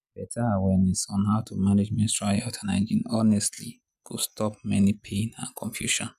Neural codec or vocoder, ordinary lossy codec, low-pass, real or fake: none; none; 14.4 kHz; real